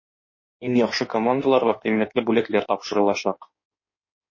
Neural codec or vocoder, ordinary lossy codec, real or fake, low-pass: codec, 16 kHz in and 24 kHz out, 1.1 kbps, FireRedTTS-2 codec; MP3, 32 kbps; fake; 7.2 kHz